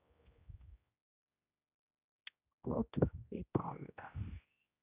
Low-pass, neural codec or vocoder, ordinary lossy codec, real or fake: 3.6 kHz; codec, 16 kHz, 1 kbps, X-Codec, HuBERT features, trained on general audio; Opus, 64 kbps; fake